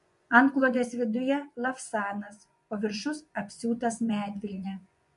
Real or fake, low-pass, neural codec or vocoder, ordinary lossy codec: fake; 10.8 kHz; vocoder, 24 kHz, 100 mel bands, Vocos; MP3, 64 kbps